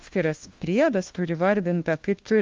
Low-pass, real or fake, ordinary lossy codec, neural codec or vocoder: 7.2 kHz; fake; Opus, 32 kbps; codec, 16 kHz, 1 kbps, FunCodec, trained on LibriTTS, 50 frames a second